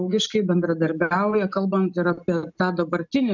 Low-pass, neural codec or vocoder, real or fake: 7.2 kHz; none; real